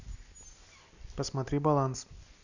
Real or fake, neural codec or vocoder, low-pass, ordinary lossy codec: real; none; 7.2 kHz; none